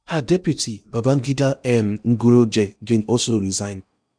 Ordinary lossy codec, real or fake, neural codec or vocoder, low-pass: none; fake; codec, 16 kHz in and 24 kHz out, 0.8 kbps, FocalCodec, streaming, 65536 codes; 9.9 kHz